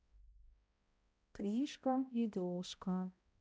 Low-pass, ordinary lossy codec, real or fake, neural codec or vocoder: none; none; fake; codec, 16 kHz, 0.5 kbps, X-Codec, HuBERT features, trained on balanced general audio